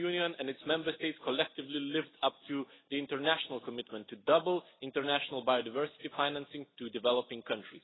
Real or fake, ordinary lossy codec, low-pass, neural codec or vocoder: real; AAC, 16 kbps; 7.2 kHz; none